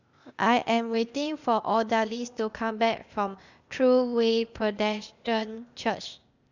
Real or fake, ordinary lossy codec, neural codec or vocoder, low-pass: fake; none; codec, 16 kHz, 0.8 kbps, ZipCodec; 7.2 kHz